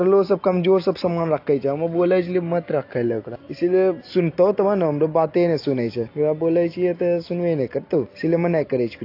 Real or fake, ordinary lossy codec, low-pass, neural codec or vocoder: real; AAC, 32 kbps; 5.4 kHz; none